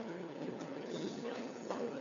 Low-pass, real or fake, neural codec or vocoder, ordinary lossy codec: 7.2 kHz; fake; codec, 16 kHz, 4 kbps, FunCodec, trained on LibriTTS, 50 frames a second; MP3, 64 kbps